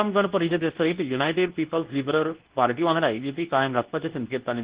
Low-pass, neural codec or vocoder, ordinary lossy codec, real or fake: 3.6 kHz; codec, 24 kHz, 0.9 kbps, WavTokenizer, medium speech release version 2; Opus, 16 kbps; fake